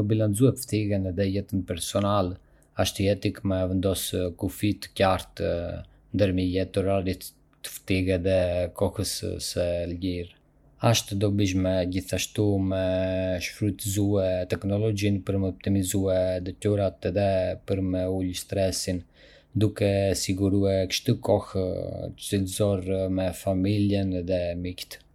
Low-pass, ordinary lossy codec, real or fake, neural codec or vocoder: 19.8 kHz; MP3, 96 kbps; real; none